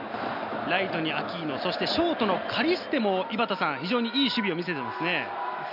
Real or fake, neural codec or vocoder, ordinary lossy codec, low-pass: real; none; none; 5.4 kHz